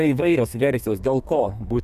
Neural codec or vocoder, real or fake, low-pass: codec, 32 kHz, 1.9 kbps, SNAC; fake; 14.4 kHz